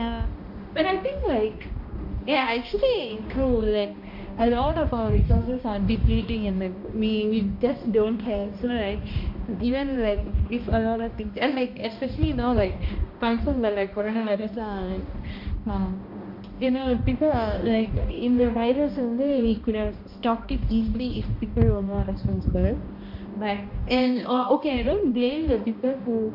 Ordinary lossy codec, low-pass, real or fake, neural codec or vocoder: MP3, 48 kbps; 5.4 kHz; fake; codec, 16 kHz, 1 kbps, X-Codec, HuBERT features, trained on balanced general audio